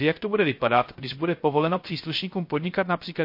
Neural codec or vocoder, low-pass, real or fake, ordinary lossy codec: codec, 16 kHz, 0.3 kbps, FocalCodec; 5.4 kHz; fake; MP3, 32 kbps